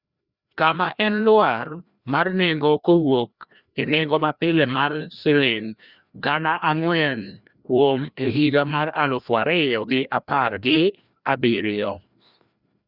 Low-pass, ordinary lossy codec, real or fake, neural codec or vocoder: 5.4 kHz; Opus, 64 kbps; fake; codec, 16 kHz, 1 kbps, FreqCodec, larger model